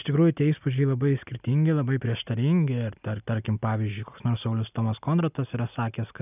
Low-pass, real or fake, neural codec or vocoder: 3.6 kHz; real; none